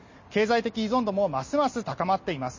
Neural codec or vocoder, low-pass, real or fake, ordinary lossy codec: none; 7.2 kHz; real; MP3, 32 kbps